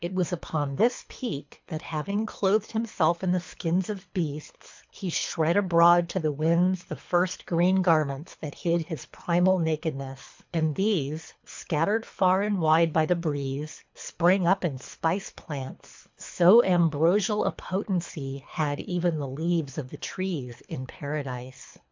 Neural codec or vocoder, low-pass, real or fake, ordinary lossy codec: codec, 24 kHz, 3 kbps, HILCodec; 7.2 kHz; fake; MP3, 64 kbps